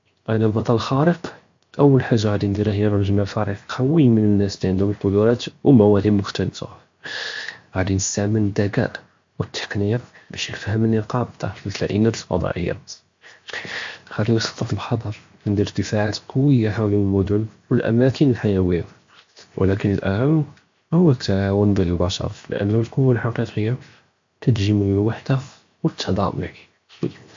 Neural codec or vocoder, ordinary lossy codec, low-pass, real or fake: codec, 16 kHz, 0.7 kbps, FocalCodec; MP3, 48 kbps; 7.2 kHz; fake